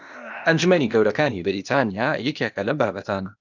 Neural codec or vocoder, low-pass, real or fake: codec, 16 kHz, 0.8 kbps, ZipCodec; 7.2 kHz; fake